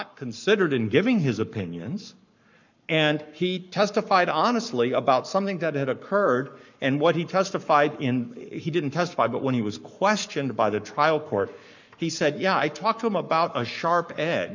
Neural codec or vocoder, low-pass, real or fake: codec, 44.1 kHz, 7.8 kbps, Pupu-Codec; 7.2 kHz; fake